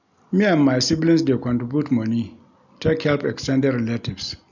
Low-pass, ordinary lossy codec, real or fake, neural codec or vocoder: 7.2 kHz; none; real; none